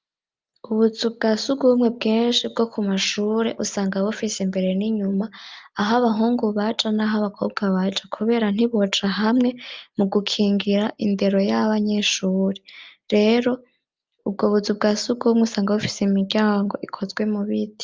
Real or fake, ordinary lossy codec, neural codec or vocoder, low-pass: real; Opus, 24 kbps; none; 7.2 kHz